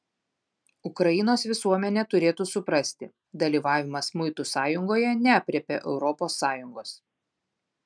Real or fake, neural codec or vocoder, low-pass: real; none; 9.9 kHz